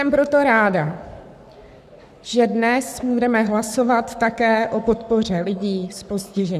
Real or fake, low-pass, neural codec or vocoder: fake; 14.4 kHz; codec, 44.1 kHz, 7.8 kbps, Pupu-Codec